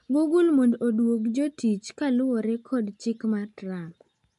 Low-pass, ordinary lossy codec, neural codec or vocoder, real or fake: 10.8 kHz; MP3, 64 kbps; none; real